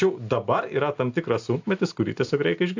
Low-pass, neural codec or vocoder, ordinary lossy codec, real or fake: 7.2 kHz; none; AAC, 48 kbps; real